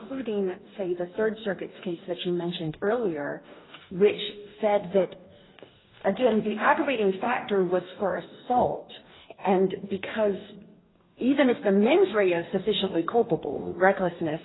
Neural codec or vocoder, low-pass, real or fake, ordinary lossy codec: codec, 44.1 kHz, 2.6 kbps, DAC; 7.2 kHz; fake; AAC, 16 kbps